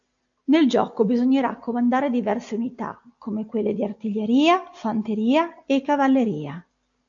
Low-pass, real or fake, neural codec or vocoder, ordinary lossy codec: 7.2 kHz; real; none; AAC, 64 kbps